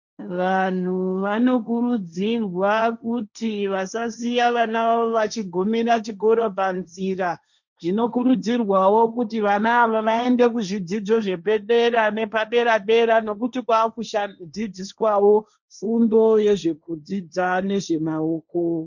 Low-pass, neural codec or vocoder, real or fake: 7.2 kHz; codec, 16 kHz, 1.1 kbps, Voila-Tokenizer; fake